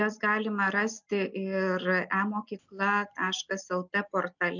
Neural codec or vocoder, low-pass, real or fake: none; 7.2 kHz; real